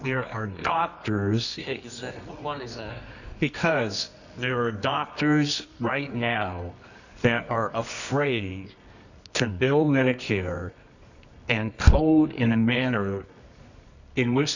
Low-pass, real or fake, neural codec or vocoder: 7.2 kHz; fake; codec, 24 kHz, 0.9 kbps, WavTokenizer, medium music audio release